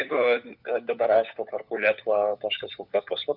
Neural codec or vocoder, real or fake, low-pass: codec, 16 kHz, 4 kbps, FunCodec, trained on LibriTTS, 50 frames a second; fake; 5.4 kHz